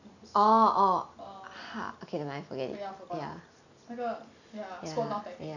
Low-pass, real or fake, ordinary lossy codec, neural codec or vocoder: 7.2 kHz; real; none; none